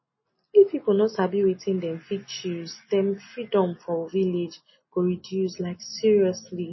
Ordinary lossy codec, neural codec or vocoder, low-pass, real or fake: MP3, 24 kbps; none; 7.2 kHz; real